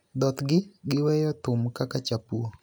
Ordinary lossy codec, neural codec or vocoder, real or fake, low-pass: none; none; real; none